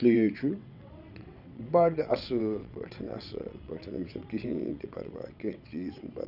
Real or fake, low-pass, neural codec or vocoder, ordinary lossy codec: fake; 5.4 kHz; vocoder, 22.05 kHz, 80 mel bands, WaveNeXt; none